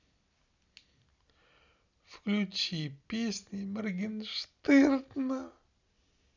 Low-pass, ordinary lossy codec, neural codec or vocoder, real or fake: 7.2 kHz; none; none; real